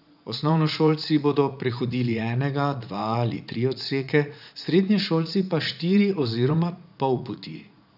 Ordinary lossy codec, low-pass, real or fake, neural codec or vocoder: none; 5.4 kHz; fake; vocoder, 44.1 kHz, 80 mel bands, Vocos